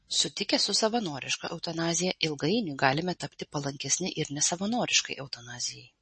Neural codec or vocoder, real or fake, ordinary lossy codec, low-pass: none; real; MP3, 32 kbps; 10.8 kHz